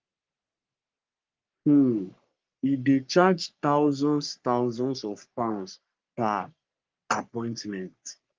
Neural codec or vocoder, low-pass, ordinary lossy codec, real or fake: codec, 44.1 kHz, 3.4 kbps, Pupu-Codec; 7.2 kHz; Opus, 32 kbps; fake